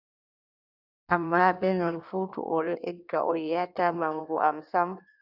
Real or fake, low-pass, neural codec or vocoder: fake; 5.4 kHz; codec, 16 kHz in and 24 kHz out, 1.1 kbps, FireRedTTS-2 codec